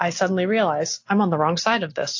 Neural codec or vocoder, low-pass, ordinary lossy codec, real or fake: none; 7.2 kHz; AAC, 48 kbps; real